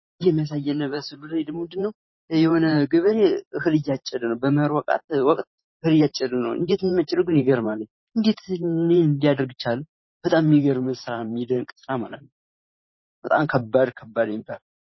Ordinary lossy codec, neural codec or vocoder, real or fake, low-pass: MP3, 24 kbps; vocoder, 44.1 kHz, 128 mel bands every 512 samples, BigVGAN v2; fake; 7.2 kHz